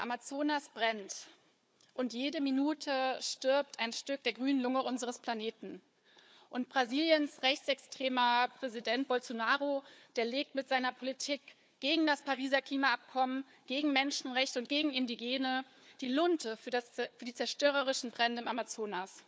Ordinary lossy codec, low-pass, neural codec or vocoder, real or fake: none; none; codec, 16 kHz, 4 kbps, FunCodec, trained on Chinese and English, 50 frames a second; fake